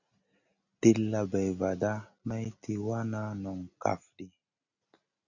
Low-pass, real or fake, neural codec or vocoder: 7.2 kHz; real; none